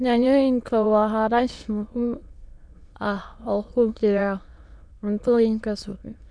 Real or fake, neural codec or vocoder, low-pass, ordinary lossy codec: fake; autoencoder, 22.05 kHz, a latent of 192 numbers a frame, VITS, trained on many speakers; 9.9 kHz; none